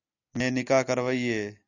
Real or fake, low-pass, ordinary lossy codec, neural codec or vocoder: real; 7.2 kHz; Opus, 64 kbps; none